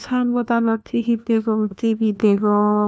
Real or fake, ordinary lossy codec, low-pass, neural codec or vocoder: fake; none; none; codec, 16 kHz, 1 kbps, FunCodec, trained on LibriTTS, 50 frames a second